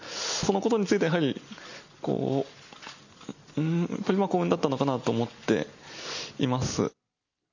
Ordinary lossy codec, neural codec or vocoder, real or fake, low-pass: none; none; real; 7.2 kHz